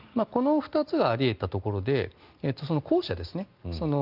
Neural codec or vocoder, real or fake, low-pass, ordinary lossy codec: none; real; 5.4 kHz; Opus, 24 kbps